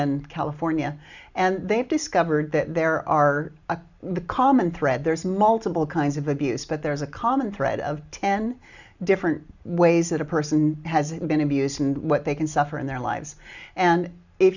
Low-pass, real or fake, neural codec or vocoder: 7.2 kHz; real; none